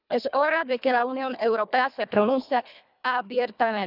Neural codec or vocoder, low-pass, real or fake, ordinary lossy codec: codec, 24 kHz, 1.5 kbps, HILCodec; 5.4 kHz; fake; none